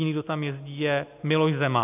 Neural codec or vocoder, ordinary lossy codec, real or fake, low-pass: none; AAC, 32 kbps; real; 3.6 kHz